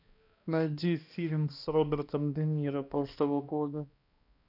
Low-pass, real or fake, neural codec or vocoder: 5.4 kHz; fake; codec, 16 kHz, 1 kbps, X-Codec, HuBERT features, trained on balanced general audio